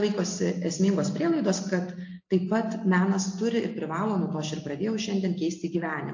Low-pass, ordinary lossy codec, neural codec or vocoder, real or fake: 7.2 kHz; AAC, 48 kbps; none; real